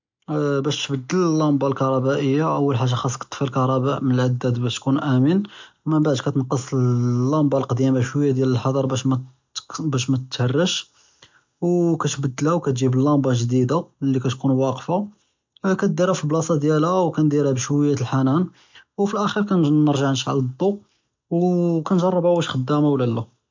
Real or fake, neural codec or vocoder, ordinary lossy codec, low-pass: real; none; MP3, 64 kbps; 7.2 kHz